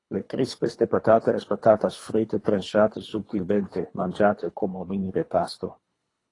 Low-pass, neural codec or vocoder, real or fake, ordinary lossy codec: 10.8 kHz; codec, 24 kHz, 3 kbps, HILCodec; fake; AAC, 32 kbps